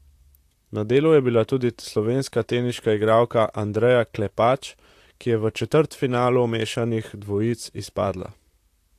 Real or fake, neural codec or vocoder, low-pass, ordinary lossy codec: fake; vocoder, 44.1 kHz, 128 mel bands, Pupu-Vocoder; 14.4 kHz; AAC, 64 kbps